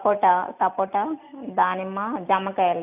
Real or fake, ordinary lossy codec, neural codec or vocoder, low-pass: real; none; none; 3.6 kHz